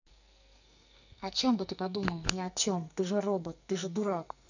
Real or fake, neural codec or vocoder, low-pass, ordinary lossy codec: fake; codec, 44.1 kHz, 2.6 kbps, SNAC; 7.2 kHz; none